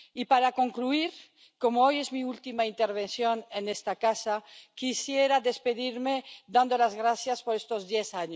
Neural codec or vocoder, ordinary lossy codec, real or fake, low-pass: none; none; real; none